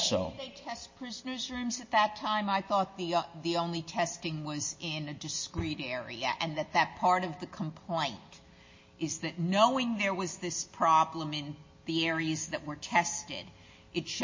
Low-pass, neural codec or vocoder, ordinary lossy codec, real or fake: 7.2 kHz; none; MP3, 32 kbps; real